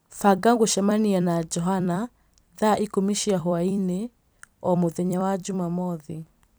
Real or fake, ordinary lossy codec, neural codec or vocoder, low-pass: fake; none; vocoder, 44.1 kHz, 128 mel bands every 256 samples, BigVGAN v2; none